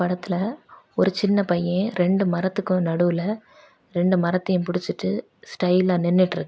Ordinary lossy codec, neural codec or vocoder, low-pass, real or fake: none; none; none; real